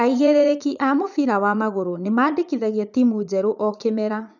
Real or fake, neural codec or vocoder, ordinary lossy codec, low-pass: fake; vocoder, 44.1 kHz, 80 mel bands, Vocos; none; 7.2 kHz